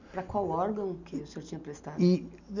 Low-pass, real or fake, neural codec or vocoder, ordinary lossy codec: 7.2 kHz; real; none; none